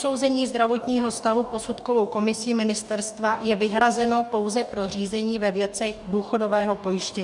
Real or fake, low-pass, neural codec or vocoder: fake; 10.8 kHz; codec, 44.1 kHz, 2.6 kbps, DAC